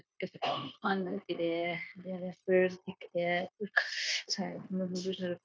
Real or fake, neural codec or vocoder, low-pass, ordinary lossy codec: fake; codec, 16 kHz, 0.9 kbps, LongCat-Audio-Codec; none; none